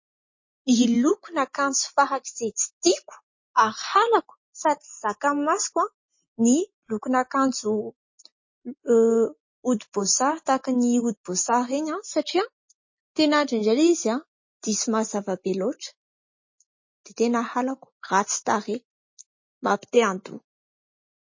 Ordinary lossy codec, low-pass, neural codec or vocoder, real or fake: MP3, 32 kbps; 7.2 kHz; none; real